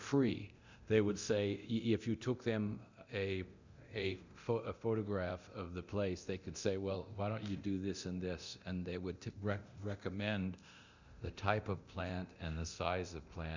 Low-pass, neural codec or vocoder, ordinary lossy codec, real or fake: 7.2 kHz; codec, 24 kHz, 0.9 kbps, DualCodec; Opus, 64 kbps; fake